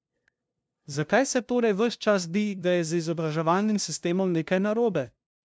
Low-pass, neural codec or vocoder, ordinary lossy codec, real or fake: none; codec, 16 kHz, 0.5 kbps, FunCodec, trained on LibriTTS, 25 frames a second; none; fake